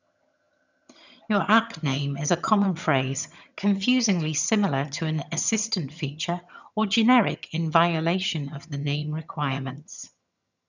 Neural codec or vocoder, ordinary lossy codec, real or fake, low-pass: vocoder, 22.05 kHz, 80 mel bands, HiFi-GAN; none; fake; 7.2 kHz